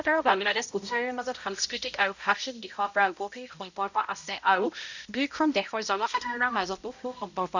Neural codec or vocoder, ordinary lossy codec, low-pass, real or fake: codec, 16 kHz, 0.5 kbps, X-Codec, HuBERT features, trained on balanced general audio; none; 7.2 kHz; fake